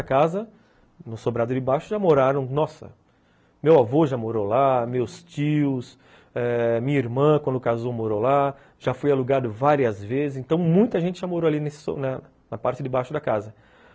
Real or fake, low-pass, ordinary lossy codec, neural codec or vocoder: real; none; none; none